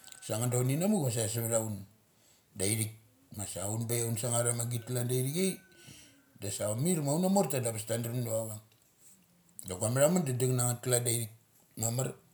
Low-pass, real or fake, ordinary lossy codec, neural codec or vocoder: none; real; none; none